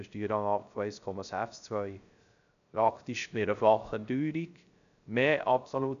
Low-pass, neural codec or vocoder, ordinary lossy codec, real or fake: 7.2 kHz; codec, 16 kHz, 0.3 kbps, FocalCodec; none; fake